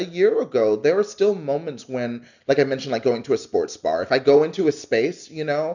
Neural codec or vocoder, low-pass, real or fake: none; 7.2 kHz; real